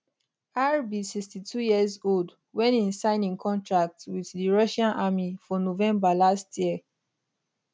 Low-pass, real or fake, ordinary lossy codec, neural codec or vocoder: none; real; none; none